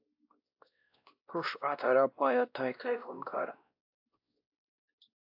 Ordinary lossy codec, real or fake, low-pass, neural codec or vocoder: AAC, 48 kbps; fake; 5.4 kHz; codec, 16 kHz, 1 kbps, X-Codec, WavLM features, trained on Multilingual LibriSpeech